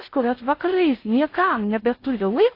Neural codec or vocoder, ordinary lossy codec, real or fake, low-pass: codec, 16 kHz in and 24 kHz out, 0.6 kbps, FocalCodec, streaming, 4096 codes; AAC, 32 kbps; fake; 5.4 kHz